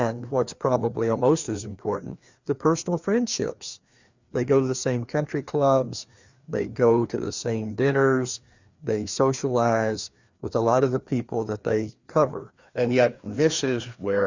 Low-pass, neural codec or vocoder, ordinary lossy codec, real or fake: 7.2 kHz; codec, 16 kHz, 2 kbps, FreqCodec, larger model; Opus, 64 kbps; fake